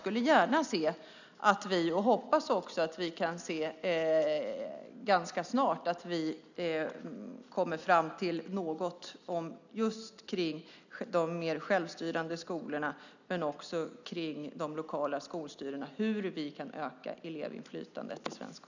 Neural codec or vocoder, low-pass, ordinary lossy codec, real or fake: none; 7.2 kHz; none; real